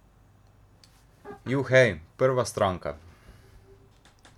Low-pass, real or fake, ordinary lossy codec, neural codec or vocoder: 19.8 kHz; real; MP3, 96 kbps; none